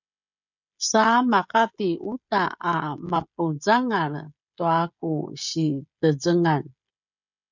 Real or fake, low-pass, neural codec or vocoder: fake; 7.2 kHz; codec, 16 kHz, 16 kbps, FreqCodec, smaller model